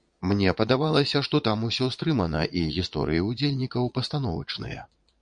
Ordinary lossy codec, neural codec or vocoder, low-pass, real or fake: MP3, 64 kbps; vocoder, 22.05 kHz, 80 mel bands, Vocos; 9.9 kHz; fake